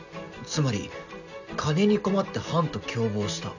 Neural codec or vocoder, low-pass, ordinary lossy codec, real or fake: none; 7.2 kHz; none; real